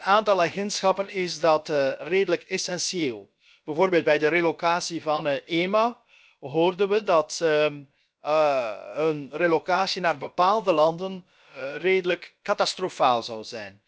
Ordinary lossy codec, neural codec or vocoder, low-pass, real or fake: none; codec, 16 kHz, about 1 kbps, DyCAST, with the encoder's durations; none; fake